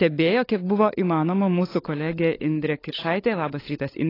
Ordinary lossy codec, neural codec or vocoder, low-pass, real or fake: AAC, 24 kbps; codec, 16 kHz, 16 kbps, FunCodec, trained on LibriTTS, 50 frames a second; 5.4 kHz; fake